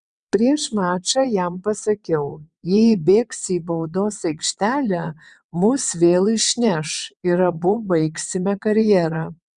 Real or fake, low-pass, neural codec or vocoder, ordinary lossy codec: fake; 9.9 kHz; vocoder, 22.05 kHz, 80 mel bands, WaveNeXt; Opus, 64 kbps